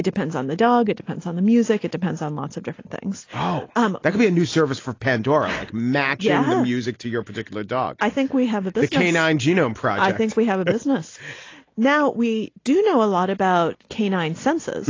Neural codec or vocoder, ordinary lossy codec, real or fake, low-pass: none; AAC, 32 kbps; real; 7.2 kHz